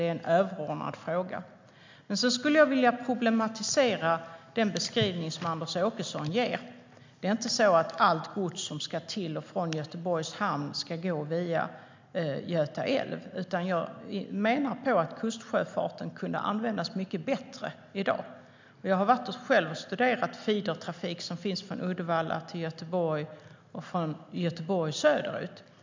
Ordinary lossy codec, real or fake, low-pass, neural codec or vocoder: MP3, 64 kbps; real; 7.2 kHz; none